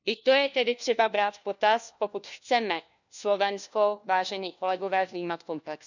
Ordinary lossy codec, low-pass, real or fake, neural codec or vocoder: none; 7.2 kHz; fake; codec, 16 kHz, 1 kbps, FunCodec, trained on LibriTTS, 50 frames a second